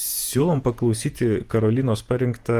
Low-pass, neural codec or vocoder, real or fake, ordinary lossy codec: 14.4 kHz; vocoder, 48 kHz, 128 mel bands, Vocos; fake; Opus, 32 kbps